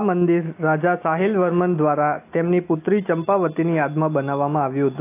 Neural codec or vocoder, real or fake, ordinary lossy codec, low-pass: none; real; AAC, 24 kbps; 3.6 kHz